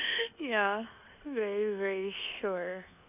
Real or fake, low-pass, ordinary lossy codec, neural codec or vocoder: fake; 3.6 kHz; none; codec, 24 kHz, 1.2 kbps, DualCodec